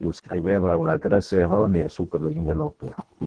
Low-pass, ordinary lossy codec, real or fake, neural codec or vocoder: 9.9 kHz; Opus, 16 kbps; fake; codec, 24 kHz, 1.5 kbps, HILCodec